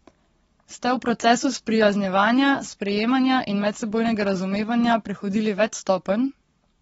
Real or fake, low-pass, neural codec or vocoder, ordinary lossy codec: fake; 19.8 kHz; codec, 44.1 kHz, 7.8 kbps, Pupu-Codec; AAC, 24 kbps